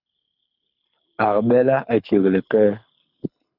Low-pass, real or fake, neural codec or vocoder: 5.4 kHz; fake; codec, 24 kHz, 6 kbps, HILCodec